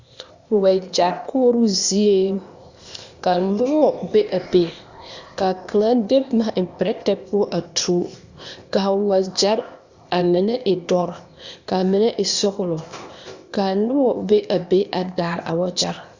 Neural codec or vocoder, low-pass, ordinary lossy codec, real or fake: codec, 16 kHz, 0.8 kbps, ZipCodec; 7.2 kHz; Opus, 64 kbps; fake